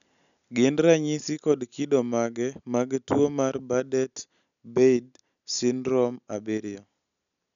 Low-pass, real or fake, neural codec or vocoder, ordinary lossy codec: 7.2 kHz; real; none; none